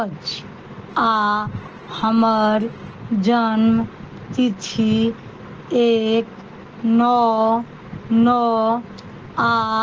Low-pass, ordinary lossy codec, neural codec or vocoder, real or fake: 7.2 kHz; Opus, 16 kbps; none; real